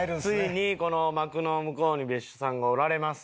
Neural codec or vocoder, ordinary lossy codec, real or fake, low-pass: none; none; real; none